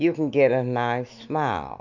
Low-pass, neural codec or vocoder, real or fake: 7.2 kHz; none; real